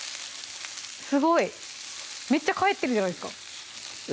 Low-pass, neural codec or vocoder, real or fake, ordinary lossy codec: none; none; real; none